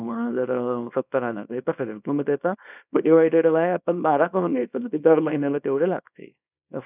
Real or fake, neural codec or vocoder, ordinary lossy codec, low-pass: fake; codec, 24 kHz, 0.9 kbps, WavTokenizer, small release; none; 3.6 kHz